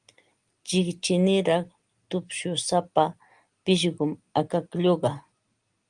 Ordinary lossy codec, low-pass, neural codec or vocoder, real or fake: Opus, 24 kbps; 9.9 kHz; none; real